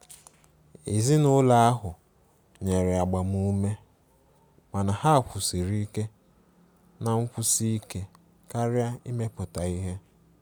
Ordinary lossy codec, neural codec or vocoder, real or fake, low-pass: none; none; real; none